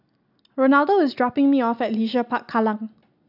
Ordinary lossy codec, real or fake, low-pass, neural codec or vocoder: AAC, 48 kbps; real; 5.4 kHz; none